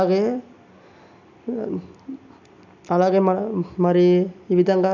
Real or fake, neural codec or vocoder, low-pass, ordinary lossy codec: real; none; 7.2 kHz; none